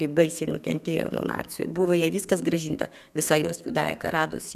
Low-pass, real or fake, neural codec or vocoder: 14.4 kHz; fake; codec, 32 kHz, 1.9 kbps, SNAC